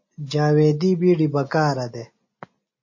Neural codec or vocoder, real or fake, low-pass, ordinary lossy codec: none; real; 7.2 kHz; MP3, 32 kbps